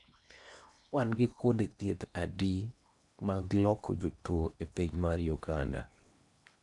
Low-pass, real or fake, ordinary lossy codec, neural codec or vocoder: 10.8 kHz; fake; none; codec, 16 kHz in and 24 kHz out, 0.8 kbps, FocalCodec, streaming, 65536 codes